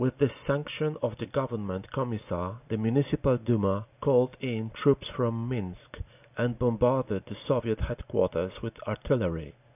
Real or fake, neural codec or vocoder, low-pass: real; none; 3.6 kHz